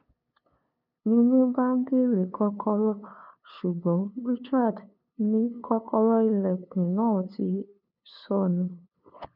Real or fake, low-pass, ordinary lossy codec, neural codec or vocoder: fake; 5.4 kHz; none; codec, 16 kHz, 2 kbps, FunCodec, trained on LibriTTS, 25 frames a second